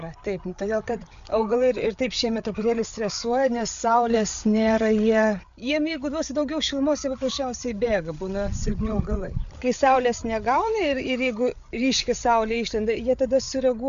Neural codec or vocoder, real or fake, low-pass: codec, 16 kHz, 8 kbps, FreqCodec, larger model; fake; 7.2 kHz